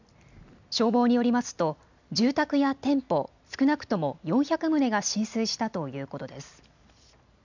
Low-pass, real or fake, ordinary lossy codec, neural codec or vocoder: 7.2 kHz; real; none; none